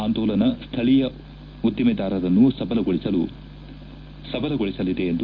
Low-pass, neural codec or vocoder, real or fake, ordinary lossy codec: 7.2 kHz; none; real; Opus, 24 kbps